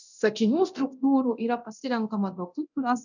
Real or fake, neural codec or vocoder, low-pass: fake; codec, 24 kHz, 0.9 kbps, DualCodec; 7.2 kHz